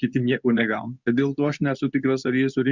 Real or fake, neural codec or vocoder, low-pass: fake; codec, 24 kHz, 0.9 kbps, WavTokenizer, medium speech release version 2; 7.2 kHz